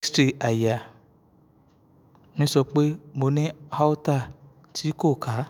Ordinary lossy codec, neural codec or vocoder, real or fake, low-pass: none; autoencoder, 48 kHz, 128 numbers a frame, DAC-VAE, trained on Japanese speech; fake; 19.8 kHz